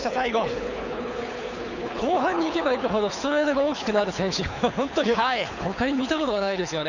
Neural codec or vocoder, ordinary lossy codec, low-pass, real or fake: codec, 24 kHz, 6 kbps, HILCodec; none; 7.2 kHz; fake